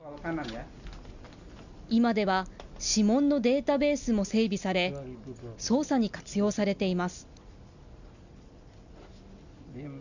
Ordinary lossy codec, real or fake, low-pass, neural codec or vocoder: none; real; 7.2 kHz; none